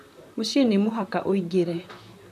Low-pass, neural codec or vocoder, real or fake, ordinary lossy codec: 14.4 kHz; vocoder, 44.1 kHz, 128 mel bands, Pupu-Vocoder; fake; AAC, 96 kbps